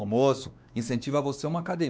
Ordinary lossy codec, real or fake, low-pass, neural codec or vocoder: none; fake; none; codec, 16 kHz, 2 kbps, X-Codec, WavLM features, trained on Multilingual LibriSpeech